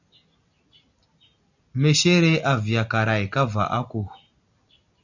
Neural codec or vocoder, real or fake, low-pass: none; real; 7.2 kHz